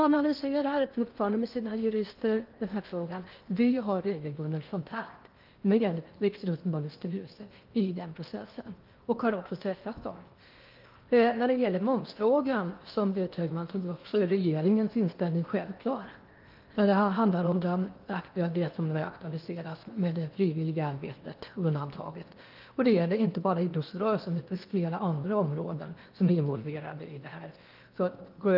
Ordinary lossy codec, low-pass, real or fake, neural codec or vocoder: Opus, 24 kbps; 5.4 kHz; fake; codec, 16 kHz in and 24 kHz out, 0.8 kbps, FocalCodec, streaming, 65536 codes